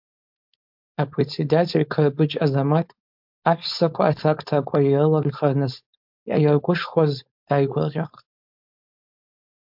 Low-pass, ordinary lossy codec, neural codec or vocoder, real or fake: 5.4 kHz; MP3, 48 kbps; codec, 16 kHz, 4.8 kbps, FACodec; fake